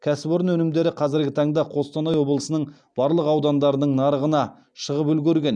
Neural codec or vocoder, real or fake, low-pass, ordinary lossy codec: none; real; 9.9 kHz; none